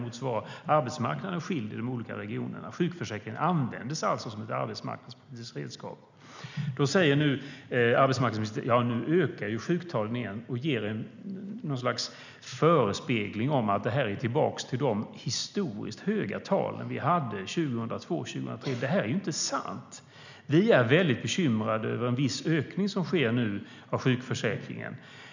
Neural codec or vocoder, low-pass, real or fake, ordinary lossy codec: none; 7.2 kHz; real; none